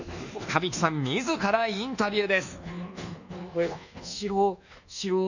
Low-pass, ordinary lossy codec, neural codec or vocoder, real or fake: 7.2 kHz; none; codec, 24 kHz, 1.2 kbps, DualCodec; fake